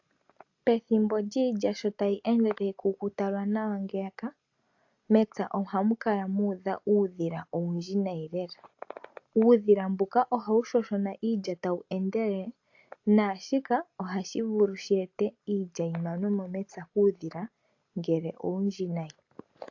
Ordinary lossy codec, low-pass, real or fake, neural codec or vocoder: AAC, 48 kbps; 7.2 kHz; real; none